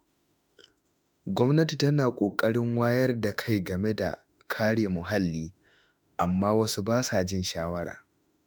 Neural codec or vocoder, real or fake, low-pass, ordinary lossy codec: autoencoder, 48 kHz, 32 numbers a frame, DAC-VAE, trained on Japanese speech; fake; none; none